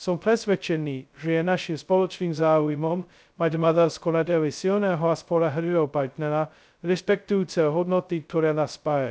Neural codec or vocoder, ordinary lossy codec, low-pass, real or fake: codec, 16 kHz, 0.2 kbps, FocalCodec; none; none; fake